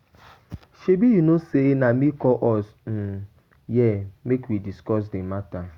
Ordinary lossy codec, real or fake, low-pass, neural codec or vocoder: Opus, 64 kbps; real; 19.8 kHz; none